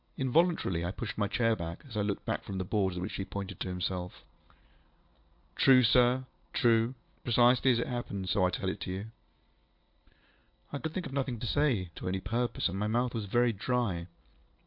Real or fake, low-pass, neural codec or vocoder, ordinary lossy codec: real; 5.4 kHz; none; MP3, 48 kbps